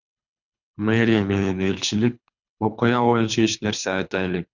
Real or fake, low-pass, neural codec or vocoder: fake; 7.2 kHz; codec, 24 kHz, 3 kbps, HILCodec